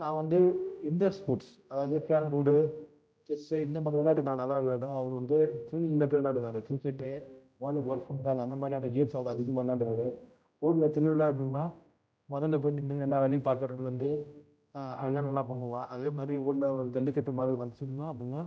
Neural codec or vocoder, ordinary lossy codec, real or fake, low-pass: codec, 16 kHz, 0.5 kbps, X-Codec, HuBERT features, trained on general audio; none; fake; none